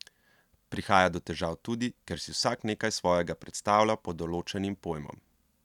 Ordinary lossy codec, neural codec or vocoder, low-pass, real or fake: none; none; 19.8 kHz; real